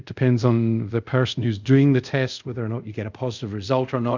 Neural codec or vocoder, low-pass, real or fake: codec, 24 kHz, 0.5 kbps, DualCodec; 7.2 kHz; fake